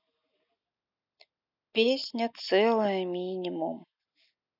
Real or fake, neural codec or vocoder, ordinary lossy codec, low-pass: real; none; none; 5.4 kHz